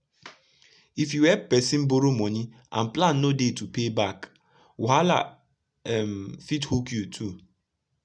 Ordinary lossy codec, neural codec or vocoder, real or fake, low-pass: none; none; real; 9.9 kHz